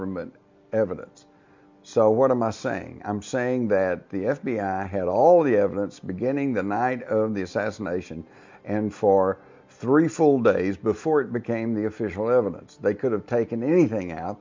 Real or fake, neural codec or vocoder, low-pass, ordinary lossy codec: real; none; 7.2 kHz; MP3, 64 kbps